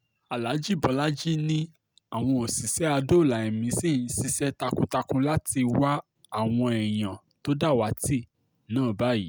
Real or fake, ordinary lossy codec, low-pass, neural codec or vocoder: real; none; none; none